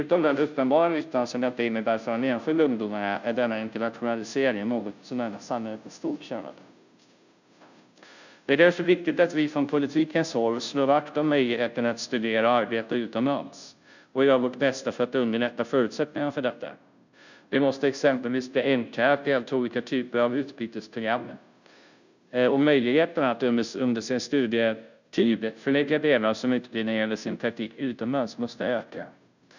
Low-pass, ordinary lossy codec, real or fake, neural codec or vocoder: 7.2 kHz; none; fake; codec, 16 kHz, 0.5 kbps, FunCodec, trained on Chinese and English, 25 frames a second